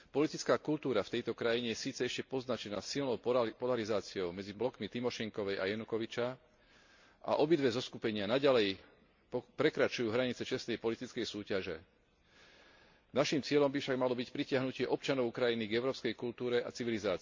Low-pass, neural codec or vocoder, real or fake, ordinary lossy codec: 7.2 kHz; none; real; MP3, 48 kbps